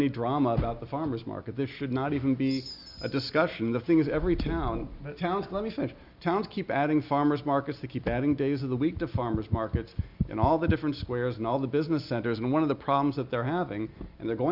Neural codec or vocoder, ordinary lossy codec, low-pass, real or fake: none; Opus, 64 kbps; 5.4 kHz; real